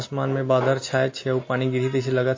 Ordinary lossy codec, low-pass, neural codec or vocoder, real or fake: MP3, 32 kbps; 7.2 kHz; none; real